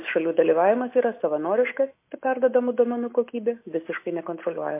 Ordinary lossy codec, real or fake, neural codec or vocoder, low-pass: MP3, 24 kbps; real; none; 3.6 kHz